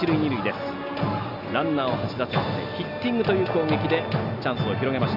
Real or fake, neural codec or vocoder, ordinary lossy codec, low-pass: real; none; none; 5.4 kHz